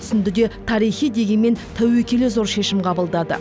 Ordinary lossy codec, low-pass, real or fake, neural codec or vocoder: none; none; real; none